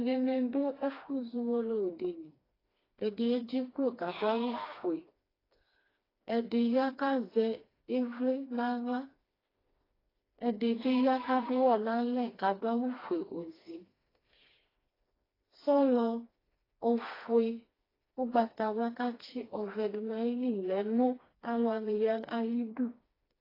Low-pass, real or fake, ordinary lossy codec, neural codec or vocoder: 5.4 kHz; fake; AAC, 24 kbps; codec, 16 kHz, 2 kbps, FreqCodec, smaller model